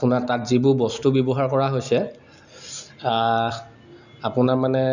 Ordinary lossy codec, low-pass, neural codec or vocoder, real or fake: none; 7.2 kHz; none; real